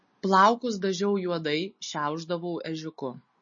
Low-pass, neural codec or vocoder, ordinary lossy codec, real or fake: 7.2 kHz; none; MP3, 32 kbps; real